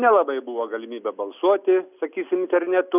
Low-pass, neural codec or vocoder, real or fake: 3.6 kHz; none; real